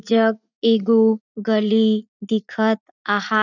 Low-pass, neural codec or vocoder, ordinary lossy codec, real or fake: 7.2 kHz; vocoder, 44.1 kHz, 80 mel bands, Vocos; none; fake